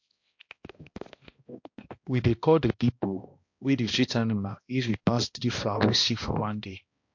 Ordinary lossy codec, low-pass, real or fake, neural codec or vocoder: MP3, 48 kbps; 7.2 kHz; fake; codec, 16 kHz, 1 kbps, X-Codec, HuBERT features, trained on balanced general audio